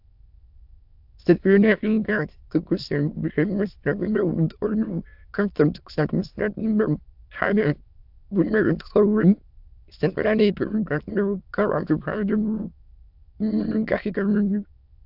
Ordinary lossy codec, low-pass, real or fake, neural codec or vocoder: AAC, 48 kbps; 5.4 kHz; fake; autoencoder, 22.05 kHz, a latent of 192 numbers a frame, VITS, trained on many speakers